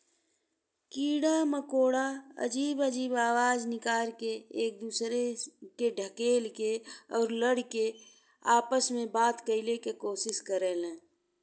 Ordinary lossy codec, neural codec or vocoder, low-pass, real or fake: none; none; none; real